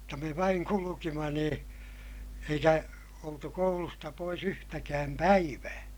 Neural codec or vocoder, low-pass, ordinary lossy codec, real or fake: none; none; none; real